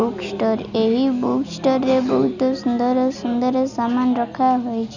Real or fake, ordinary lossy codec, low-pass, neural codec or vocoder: real; none; 7.2 kHz; none